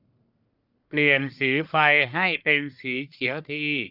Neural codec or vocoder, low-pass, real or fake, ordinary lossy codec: codec, 44.1 kHz, 1.7 kbps, Pupu-Codec; 5.4 kHz; fake; none